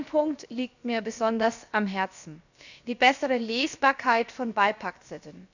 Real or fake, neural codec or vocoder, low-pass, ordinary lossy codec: fake; codec, 16 kHz, about 1 kbps, DyCAST, with the encoder's durations; 7.2 kHz; none